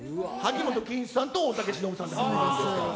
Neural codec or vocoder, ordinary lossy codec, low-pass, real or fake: none; none; none; real